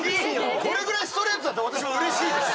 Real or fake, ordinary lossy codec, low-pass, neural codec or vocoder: real; none; none; none